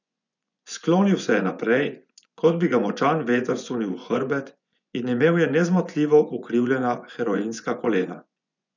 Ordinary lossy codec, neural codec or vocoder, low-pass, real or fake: none; vocoder, 24 kHz, 100 mel bands, Vocos; 7.2 kHz; fake